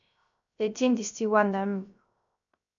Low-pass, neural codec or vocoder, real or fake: 7.2 kHz; codec, 16 kHz, 0.3 kbps, FocalCodec; fake